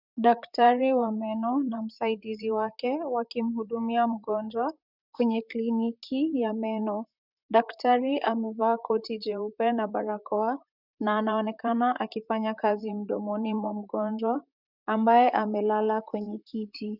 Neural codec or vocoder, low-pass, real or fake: vocoder, 44.1 kHz, 128 mel bands, Pupu-Vocoder; 5.4 kHz; fake